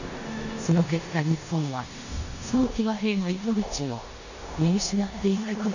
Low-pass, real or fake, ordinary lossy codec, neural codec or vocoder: 7.2 kHz; fake; none; codec, 16 kHz in and 24 kHz out, 0.9 kbps, LongCat-Audio-Codec, four codebook decoder